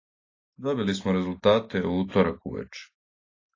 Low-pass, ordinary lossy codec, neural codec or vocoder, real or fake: 7.2 kHz; AAC, 32 kbps; none; real